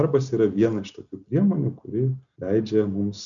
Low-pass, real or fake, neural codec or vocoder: 7.2 kHz; real; none